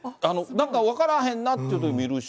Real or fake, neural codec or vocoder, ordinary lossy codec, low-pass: real; none; none; none